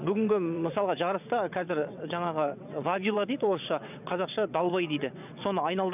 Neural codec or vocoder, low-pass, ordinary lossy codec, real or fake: vocoder, 22.05 kHz, 80 mel bands, WaveNeXt; 3.6 kHz; none; fake